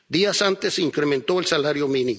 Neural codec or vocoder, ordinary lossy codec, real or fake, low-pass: none; none; real; none